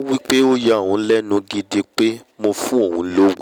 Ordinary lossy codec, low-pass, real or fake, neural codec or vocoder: none; 19.8 kHz; real; none